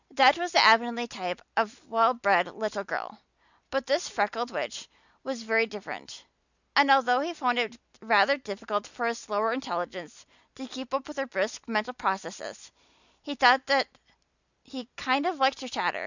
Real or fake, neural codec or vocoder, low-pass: real; none; 7.2 kHz